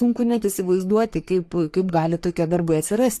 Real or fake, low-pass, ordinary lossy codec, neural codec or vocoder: fake; 14.4 kHz; AAC, 64 kbps; codec, 44.1 kHz, 3.4 kbps, Pupu-Codec